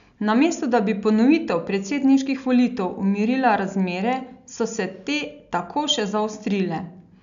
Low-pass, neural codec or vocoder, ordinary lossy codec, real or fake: 7.2 kHz; none; none; real